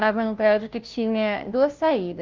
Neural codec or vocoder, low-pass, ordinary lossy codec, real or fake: codec, 16 kHz, 0.5 kbps, FunCodec, trained on Chinese and English, 25 frames a second; 7.2 kHz; Opus, 32 kbps; fake